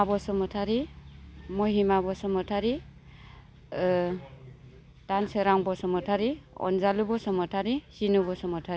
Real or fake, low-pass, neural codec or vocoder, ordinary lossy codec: real; none; none; none